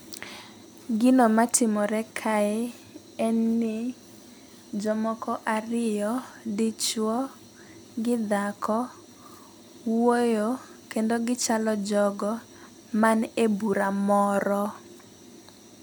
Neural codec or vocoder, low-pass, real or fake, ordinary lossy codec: none; none; real; none